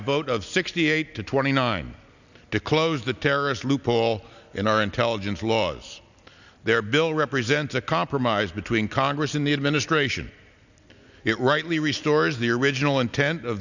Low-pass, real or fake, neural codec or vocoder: 7.2 kHz; real; none